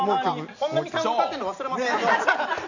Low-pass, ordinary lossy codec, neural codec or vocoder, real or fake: 7.2 kHz; none; none; real